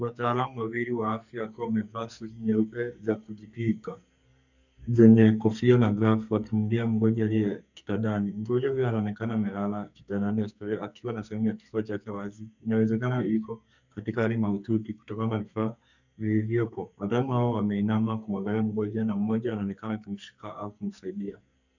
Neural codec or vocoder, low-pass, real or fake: codec, 44.1 kHz, 2.6 kbps, SNAC; 7.2 kHz; fake